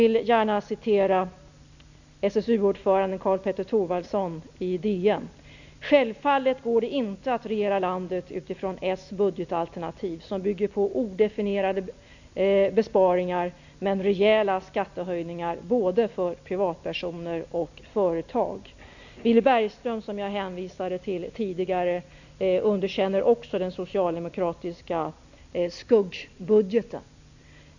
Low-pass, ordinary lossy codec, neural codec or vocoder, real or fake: 7.2 kHz; none; none; real